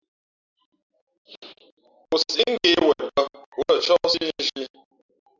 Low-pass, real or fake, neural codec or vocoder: 7.2 kHz; real; none